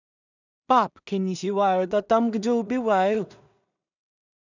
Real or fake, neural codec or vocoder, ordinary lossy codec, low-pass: fake; codec, 16 kHz in and 24 kHz out, 0.4 kbps, LongCat-Audio-Codec, two codebook decoder; none; 7.2 kHz